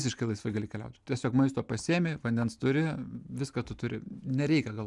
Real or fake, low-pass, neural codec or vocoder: real; 10.8 kHz; none